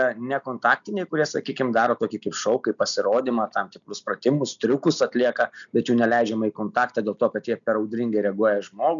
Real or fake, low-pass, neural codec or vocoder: real; 7.2 kHz; none